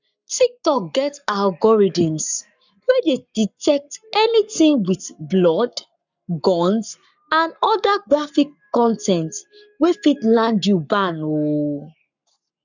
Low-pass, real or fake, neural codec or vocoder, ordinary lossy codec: 7.2 kHz; fake; codec, 44.1 kHz, 7.8 kbps, Pupu-Codec; none